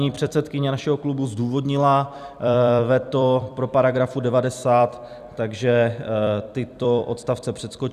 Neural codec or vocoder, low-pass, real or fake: vocoder, 44.1 kHz, 128 mel bands every 256 samples, BigVGAN v2; 14.4 kHz; fake